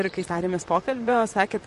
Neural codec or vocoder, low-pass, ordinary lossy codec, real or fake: vocoder, 44.1 kHz, 128 mel bands, Pupu-Vocoder; 14.4 kHz; MP3, 48 kbps; fake